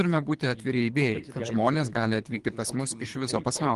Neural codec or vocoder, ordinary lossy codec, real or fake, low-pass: codec, 24 kHz, 3 kbps, HILCodec; Opus, 24 kbps; fake; 10.8 kHz